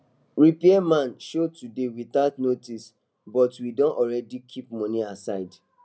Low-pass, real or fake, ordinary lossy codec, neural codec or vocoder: none; real; none; none